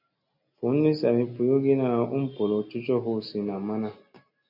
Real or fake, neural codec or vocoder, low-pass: real; none; 5.4 kHz